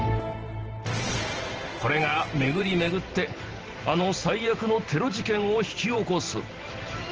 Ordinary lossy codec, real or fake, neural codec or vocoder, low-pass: Opus, 16 kbps; fake; vocoder, 44.1 kHz, 128 mel bands every 512 samples, BigVGAN v2; 7.2 kHz